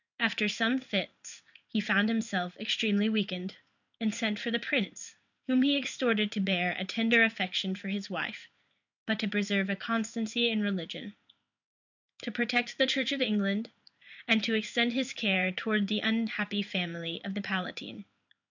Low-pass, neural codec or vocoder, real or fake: 7.2 kHz; codec, 16 kHz in and 24 kHz out, 1 kbps, XY-Tokenizer; fake